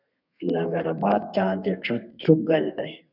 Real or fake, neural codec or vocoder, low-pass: fake; codec, 32 kHz, 1.9 kbps, SNAC; 5.4 kHz